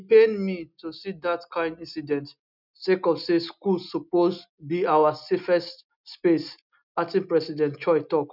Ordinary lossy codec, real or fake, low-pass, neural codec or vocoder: none; real; 5.4 kHz; none